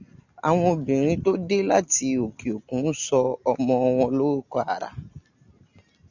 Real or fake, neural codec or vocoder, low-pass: real; none; 7.2 kHz